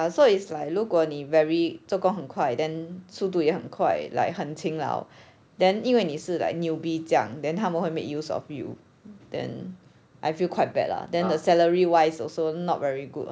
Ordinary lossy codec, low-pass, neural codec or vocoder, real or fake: none; none; none; real